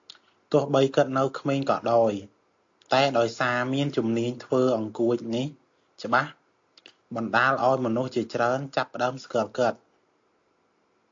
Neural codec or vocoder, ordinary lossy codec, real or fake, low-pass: none; AAC, 48 kbps; real; 7.2 kHz